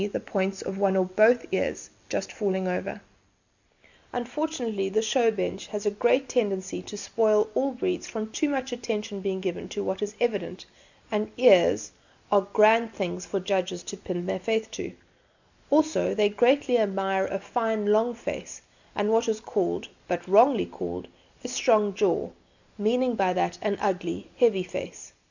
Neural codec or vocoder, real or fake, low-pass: none; real; 7.2 kHz